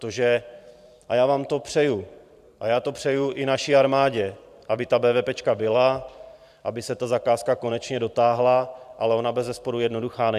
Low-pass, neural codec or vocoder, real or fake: 14.4 kHz; vocoder, 44.1 kHz, 128 mel bands every 512 samples, BigVGAN v2; fake